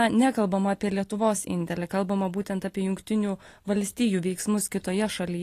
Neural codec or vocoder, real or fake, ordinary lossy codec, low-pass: none; real; AAC, 48 kbps; 14.4 kHz